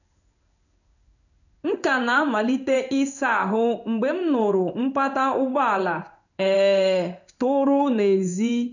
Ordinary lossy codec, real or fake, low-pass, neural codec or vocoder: none; fake; 7.2 kHz; codec, 16 kHz in and 24 kHz out, 1 kbps, XY-Tokenizer